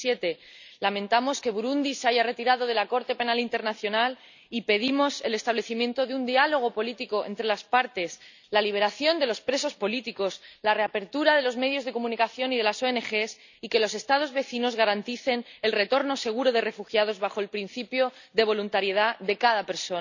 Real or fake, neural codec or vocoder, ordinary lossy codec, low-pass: real; none; none; 7.2 kHz